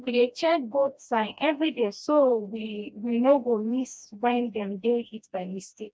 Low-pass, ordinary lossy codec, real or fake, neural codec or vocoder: none; none; fake; codec, 16 kHz, 1 kbps, FreqCodec, smaller model